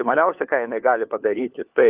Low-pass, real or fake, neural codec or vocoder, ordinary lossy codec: 3.6 kHz; fake; codec, 16 kHz, 4 kbps, FunCodec, trained on LibriTTS, 50 frames a second; Opus, 24 kbps